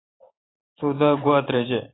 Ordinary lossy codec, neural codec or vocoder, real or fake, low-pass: AAC, 16 kbps; vocoder, 44.1 kHz, 128 mel bands every 512 samples, BigVGAN v2; fake; 7.2 kHz